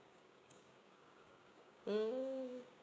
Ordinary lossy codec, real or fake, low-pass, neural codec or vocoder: none; fake; none; codec, 16 kHz, 6 kbps, DAC